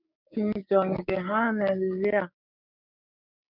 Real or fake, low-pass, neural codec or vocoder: fake; 5.4 kHz; codec, 16 kHz, 6 kbps, DAC